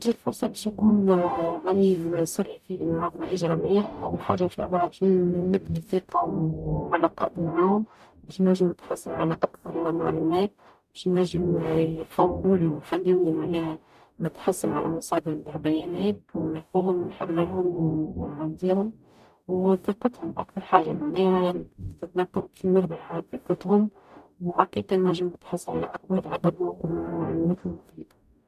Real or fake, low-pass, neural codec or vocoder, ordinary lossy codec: fake; 14.4 kHz; codec, 44.1 kHz, 0.9 kbps, DAC; none